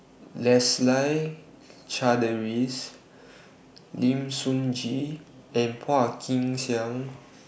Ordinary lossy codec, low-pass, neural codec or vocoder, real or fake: none; none; none; real